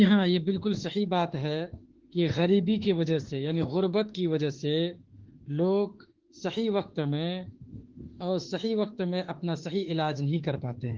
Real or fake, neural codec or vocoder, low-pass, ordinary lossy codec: fake; autoencoder, 48 kHz, 32 numbers a frame, DAC-VAE, trained on Japanese speech; 7.2 kHz; Opus, 16 kbps